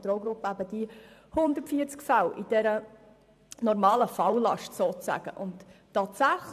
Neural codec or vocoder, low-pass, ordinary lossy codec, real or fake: vocoder, 44.1 kHz, 128 mel bands every 512 samples, BigVGAN v2; 14.4 kHz; AAC, 96 kbps; fake